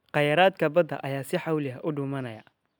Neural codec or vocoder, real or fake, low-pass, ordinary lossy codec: none; real; none; none